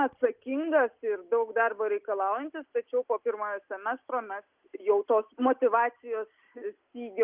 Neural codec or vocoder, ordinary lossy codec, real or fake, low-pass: none; Opus, 24 kbps; real; 3.6 kHz